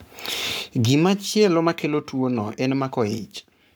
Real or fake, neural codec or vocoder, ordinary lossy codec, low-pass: fake; codec, 44.1 kHz, 7.8 kbps, Pupu-Codec; none; none